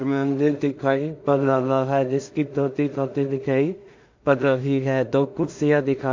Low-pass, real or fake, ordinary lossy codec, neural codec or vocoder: 7.2 kHz; fake; MP3, 48 kbps; codec, 16 kHz in and 24 kHz out, 0.4 kbps, LongCat-Audio-Codec, two codebook decoder